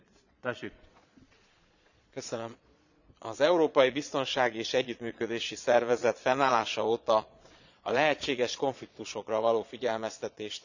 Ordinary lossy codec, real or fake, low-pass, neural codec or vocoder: none; fake; 7.2 kHz; vocoder, 22.05 kHz, 80 mel bands, Vocos